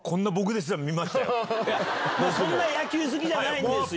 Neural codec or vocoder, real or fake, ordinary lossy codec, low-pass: none; real; none; none